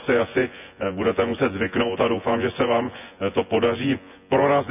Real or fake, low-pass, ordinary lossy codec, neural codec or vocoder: fake; 3.6 kHz; none; vocoder, 24 kHz, 100 mel bands, Vocos